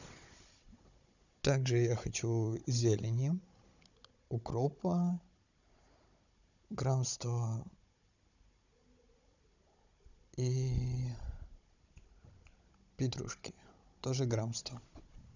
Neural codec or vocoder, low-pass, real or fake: codec, 16 kHz, 16 kbps, FunCodec, trained on Chinese and English, 50 frames a second; 7.2 kHz; fake